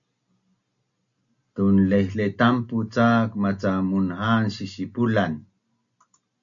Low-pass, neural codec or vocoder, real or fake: 7.2 kHz; none; real